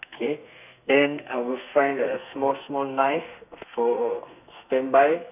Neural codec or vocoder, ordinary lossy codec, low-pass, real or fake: codec, 32 kHz, 1.9 kbps, SNAC; none; 3.6 kHz; fake